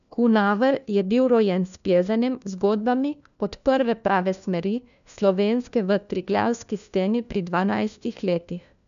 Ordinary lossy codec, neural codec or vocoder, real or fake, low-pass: none; codec, 16 kHz, 1 kbps, FunCodec, trained on LibriTTS, 50 frames a second; fake; 7.2 kHz